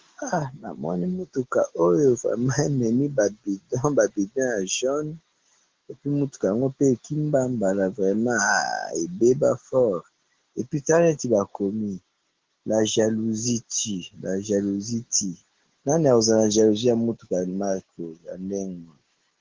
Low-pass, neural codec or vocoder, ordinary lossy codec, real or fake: 7.2 kHz; none; Opus, 16 kbps; real